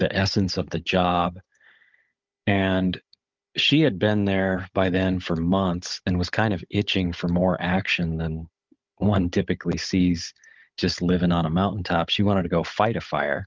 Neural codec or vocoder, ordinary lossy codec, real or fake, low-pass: codec, 16 kHz, 16 kbps, FunCodec, trained on Chinese and English, 50 frames a second; Opus, 24 kbps; fake; 7.2 kHz